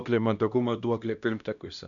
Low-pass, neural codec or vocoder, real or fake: 7.2 kHz; codec, 16 kHz, 1 kbps, X-Codec, HuBERT features, trained on LibriSpeech; fake